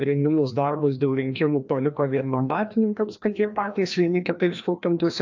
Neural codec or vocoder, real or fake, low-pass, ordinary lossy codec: codec, 16 kHz, 1 kbps, FreqCodec, larger model; fake; 7.2 kHz; AAC, 48 kbps